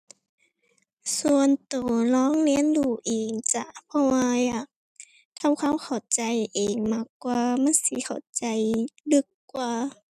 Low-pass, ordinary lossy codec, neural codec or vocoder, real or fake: 14.4 kHz; none; none; real